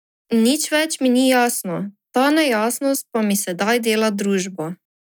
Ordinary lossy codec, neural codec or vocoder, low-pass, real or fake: none; none; 19.8 kHz; real